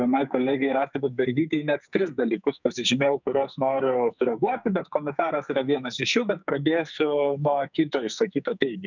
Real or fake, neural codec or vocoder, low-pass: fake; codec, 44.1 kHz, 2.6 kbps, SNAC; 7.2 kHz